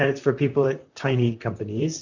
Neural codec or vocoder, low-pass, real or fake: vocoder, 44.1 kHz, 128 mel bands, Pupu-Vocoder; 7.2 kHz; fake